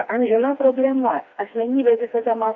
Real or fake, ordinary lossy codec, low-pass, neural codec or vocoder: fake; Opus, 64 kbps; 7.2 kHz; codec, 16 kHz, 2 kbps, FreqCodec, smaller model